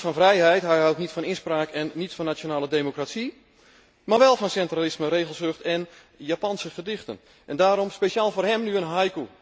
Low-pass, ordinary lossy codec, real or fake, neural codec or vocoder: none; none; real; none